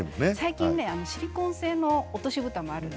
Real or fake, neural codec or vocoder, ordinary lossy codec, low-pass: real; none; none; none